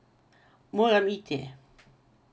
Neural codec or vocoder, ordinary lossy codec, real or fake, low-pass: none; none; real; none